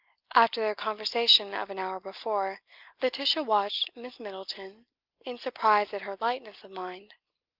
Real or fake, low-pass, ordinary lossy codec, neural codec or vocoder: real; 5.4 kHz; Opus, 24 kbps; none